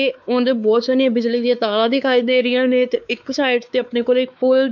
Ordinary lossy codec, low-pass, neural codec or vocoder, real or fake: none; 7.2 kHz; codec, 16 kHz, 4 kbps, X-Codec, WavLM features, trained on Multilingual LibriSpeech; fake